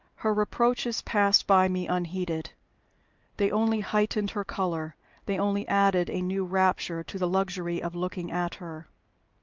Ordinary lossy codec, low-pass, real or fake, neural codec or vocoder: Opus, 24 kbps; 7.2 kHz; real; none